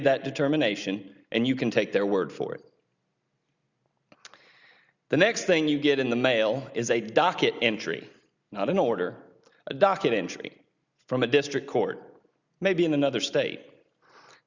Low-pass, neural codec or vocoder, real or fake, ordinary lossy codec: 7.2 kHz; none; real; Opus, 64 kbps